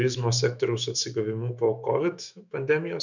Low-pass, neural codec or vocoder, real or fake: 7.2 kHz; autoencoder, 48 kHz, 128 numbers a frame, DAC-VAE, trained on Japanese speech; fake